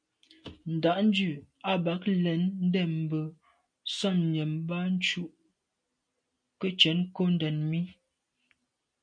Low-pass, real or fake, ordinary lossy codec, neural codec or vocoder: 9.9 kHz; real; MP3, 64 kbps; none